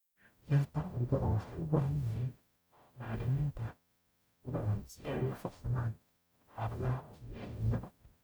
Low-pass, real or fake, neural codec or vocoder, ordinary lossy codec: none; fake; codec, 44.1 kHz, 0.9 kbps, DAC; none